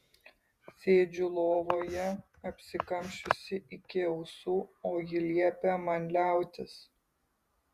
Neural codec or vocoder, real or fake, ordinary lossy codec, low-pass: vocoder, 48 kHz, 128 mel bands, Vocos; fake; Opus, 64 kbps; 14.4 kHz